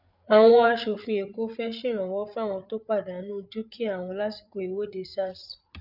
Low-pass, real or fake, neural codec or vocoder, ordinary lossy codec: 5.4 kHz; fake; codec, 16 kHz, 8 kbps, FreqCodec, larger model; none